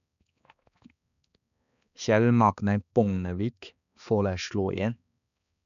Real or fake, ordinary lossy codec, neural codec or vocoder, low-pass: fake; none; codec, 16 kHz, 2 kbps, X-Codec, HuBERT features, trained on balanced general audio; 7.2 kHz